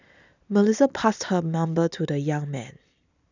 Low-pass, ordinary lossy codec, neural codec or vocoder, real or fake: 7.2 kHz; none; none; real